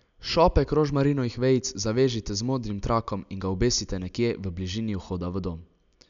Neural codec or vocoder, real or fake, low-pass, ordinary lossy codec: none; real; 7.2 kHz; none